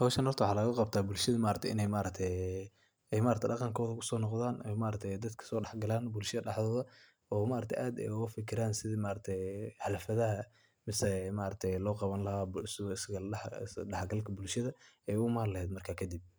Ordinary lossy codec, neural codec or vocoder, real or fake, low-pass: none; none; real; none